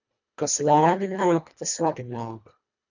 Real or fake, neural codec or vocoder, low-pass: fake; codec, 24 kHz, 1.5 kbps, HILCodec; 7.2 kHz